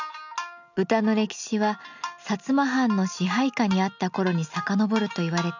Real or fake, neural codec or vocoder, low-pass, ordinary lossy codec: real; none; 7.2 kHz; none